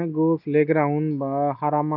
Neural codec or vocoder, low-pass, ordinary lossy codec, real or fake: none; 5.4 kHz; none; real